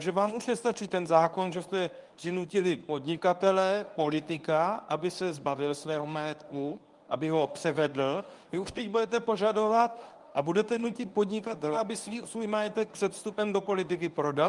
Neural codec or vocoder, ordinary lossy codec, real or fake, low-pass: codec, 24 kHz, 0.9 kbps, WavTokenizer, medium speech release version 1; Opus, 32 kbps; fake; 10.8 kHz